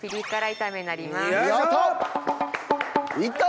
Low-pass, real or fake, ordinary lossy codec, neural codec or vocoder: none; real; none; none